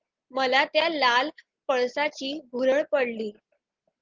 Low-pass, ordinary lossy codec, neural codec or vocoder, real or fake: 7.2 kHz; Opus, 16 kbps; none; real